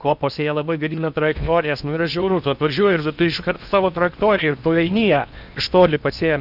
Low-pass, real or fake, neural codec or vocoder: 5.4 kHz; fake; codec, 16 kHz in and 24 kHz out, 0.8 kbps, FocalCodec, streaming, 65536 codes